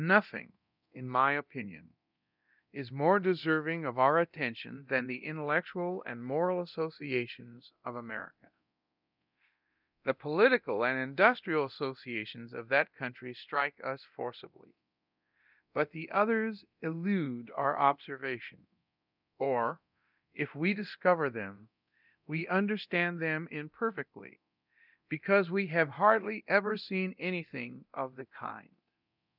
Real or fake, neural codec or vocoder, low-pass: fake; codec, 24 kHz, 0.9 kbps, DualCodec; 5.4 kHz